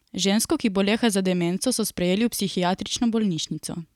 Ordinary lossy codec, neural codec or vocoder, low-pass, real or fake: none; none; 19.8 kHz; real